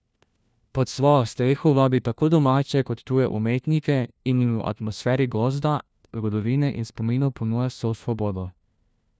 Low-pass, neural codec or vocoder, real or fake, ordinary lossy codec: none; codec, 16 kHz, 1 kbps, FunCodec, trained on LibriTTS, 50 frames a second; fake; none